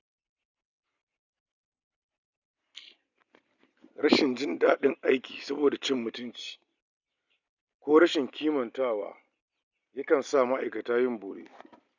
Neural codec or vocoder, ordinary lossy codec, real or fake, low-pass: none; none; real; 7.2 kHz